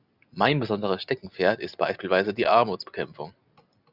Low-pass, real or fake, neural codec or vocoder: 5.4 kHz; real; none